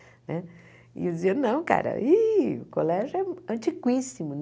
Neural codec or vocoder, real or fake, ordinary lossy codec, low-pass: none; real; none; none